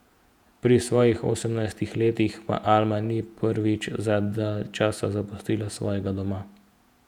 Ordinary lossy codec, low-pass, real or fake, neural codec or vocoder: none; 19.8 kHz; fake; vocoder, 48 kHz, 128 mel bands, Vocos